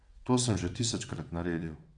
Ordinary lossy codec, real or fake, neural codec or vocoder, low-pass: none; fake; vocoder, 22.05 kHz, 80 mel bands, WaveNeXt; 9.9 kHz